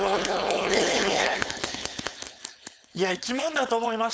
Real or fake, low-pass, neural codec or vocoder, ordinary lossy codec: fake; none; codec, 16 kHz, 4.8 kbps, FACodec; none